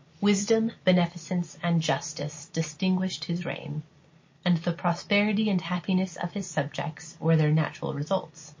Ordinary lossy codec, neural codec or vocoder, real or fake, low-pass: MP3, 32 kbps; none; real; 7.2 kHz